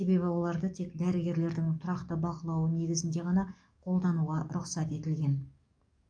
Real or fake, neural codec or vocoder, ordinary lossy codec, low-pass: fake; codec, 44.1 kHz, 7.8 kbps, DAC; none; 9.9 kHz